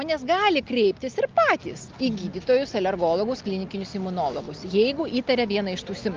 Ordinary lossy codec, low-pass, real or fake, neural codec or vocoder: Opus, 32 kbps; 7.2 kHz; real; none